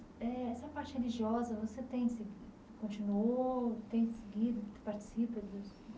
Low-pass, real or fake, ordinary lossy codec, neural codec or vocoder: none; real; none; none